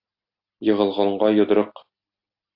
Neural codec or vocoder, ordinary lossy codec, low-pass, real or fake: none; AAC, 48 kbps; 5.4 kHz; real